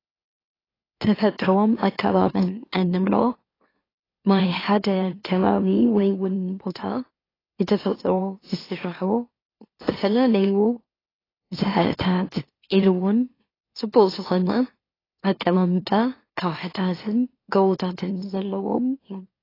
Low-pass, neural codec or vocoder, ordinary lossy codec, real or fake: 5.4 kHz; autoencoder, 44.1 kHz, a latent of 192 numbers a frame, MeloTTS; AAC, 24 kbps; fake